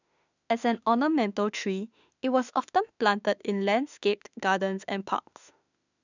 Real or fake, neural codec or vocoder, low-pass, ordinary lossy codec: fake; autoencoder, 48 kHz, 32 numbers a frame, DAC-VAE, trained on Japanese speech; 7.2 kHz; none